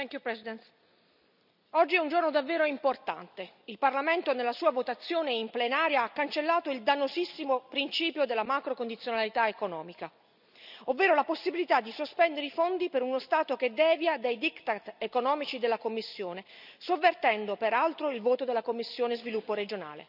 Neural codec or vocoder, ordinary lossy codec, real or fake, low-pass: none; none; real; 5.4 kHz